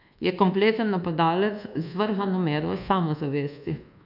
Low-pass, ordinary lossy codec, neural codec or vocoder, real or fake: 5.4 kHz; none; codec, 24 kHz, 1.2 kbps, DualCodec; fake